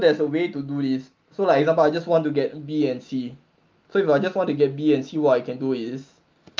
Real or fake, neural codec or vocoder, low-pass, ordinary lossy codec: real; none; 7.2 kHz; Opus, 24 kbps